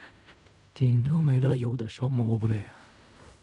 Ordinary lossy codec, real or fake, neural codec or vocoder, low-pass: none; fake; codec, 16 kHz in and 24 kHz out, 0.4 kbps, LongCat-Audio-Codec, fine tuned four codebook decoder; 10.8 kHz